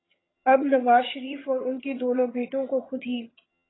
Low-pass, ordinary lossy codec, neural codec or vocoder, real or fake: 7.2 kHz; AAC, 16 kbps; vocoder, 22.05 kHz, 80 mel bands, HiFi-GAN; fake